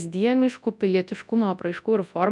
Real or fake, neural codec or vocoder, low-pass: fake; codec, 24 kHz, 0.9 kbps, WavTokenizer, large speech release; 10.8 kHz